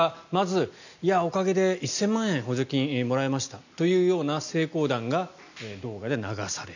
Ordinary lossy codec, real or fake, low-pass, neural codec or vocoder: none; real; 7.2 kHz; none